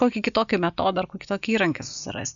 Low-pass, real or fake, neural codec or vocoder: 7.2 kHz; real; none